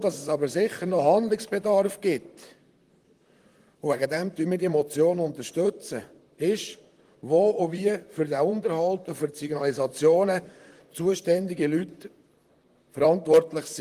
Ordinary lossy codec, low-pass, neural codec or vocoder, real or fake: Opus, 24 kbps; 14.4 kHz; vocoder, 44.1 kHz, 128 mel bands, Pupu-Vocoder; fake